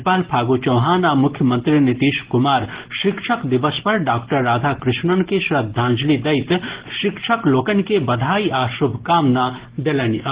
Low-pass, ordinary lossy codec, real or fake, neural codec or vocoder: 3.6 kHz; Opus, 16 kbps; real; none